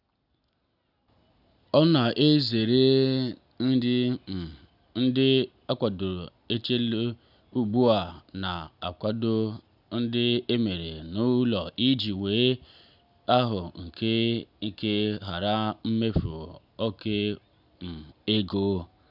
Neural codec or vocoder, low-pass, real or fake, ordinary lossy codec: none; 5.4 kHz; real; none